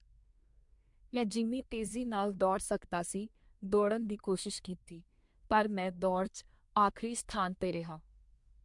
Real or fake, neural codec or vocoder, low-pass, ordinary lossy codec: fake; codec, 24 kHz, 1 kbps, SNAC; 10.8 kHz; MP3, 64 kbps